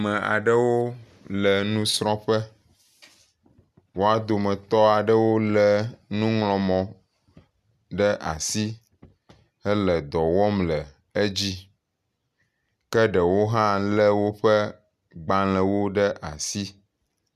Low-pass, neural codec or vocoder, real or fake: 14.4 kHz; none; real